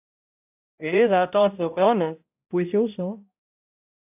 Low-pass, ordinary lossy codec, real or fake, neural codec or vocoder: 3.6 kHz; AAC, 32 kbps; fake; codec, 16 kHz, 0.5 kbps, X-Codec, HuBERT features, trained on balanced general audio